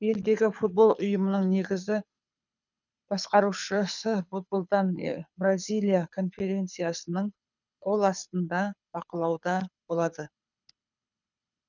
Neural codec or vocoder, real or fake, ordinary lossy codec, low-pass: codec, 24 kHz, 6 kbps, HILCodec; fake; none; 7.2 kHz